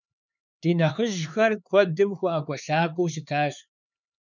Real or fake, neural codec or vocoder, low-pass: fake; codec, 16 kHz, 4 kbps, X-Codec, HuBERT features, trained on LibriSpeech; 7.2 kHz